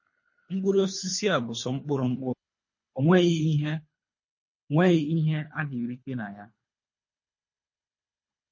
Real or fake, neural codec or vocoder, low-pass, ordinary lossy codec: fake; codec, 24 kHz, 3 kbps, HILCodec; 7.2 kHz; MP3, 32 kbps